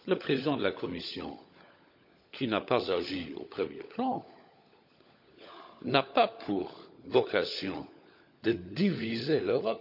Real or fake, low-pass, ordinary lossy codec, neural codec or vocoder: fake; 5.4 kHz; none; codec, 16 kHz, 16 kbps, FunCodec, trained on LibriTTS, 50 frames a second